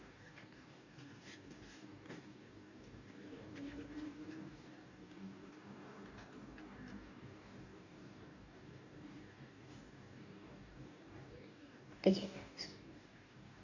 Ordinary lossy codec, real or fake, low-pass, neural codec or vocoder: none; fake; 7.2 kHz; codec, 44.1 kHz, 2.6 kbps, DAC